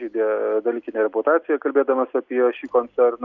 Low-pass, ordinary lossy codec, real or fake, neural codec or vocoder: 7.2 kHz; Opus, 64 kbps; real; none